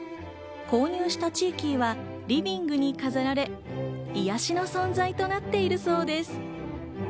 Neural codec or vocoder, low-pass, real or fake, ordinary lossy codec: none; none; real; none